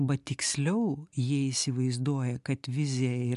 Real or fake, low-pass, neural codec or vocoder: real; 10.8 kHz; none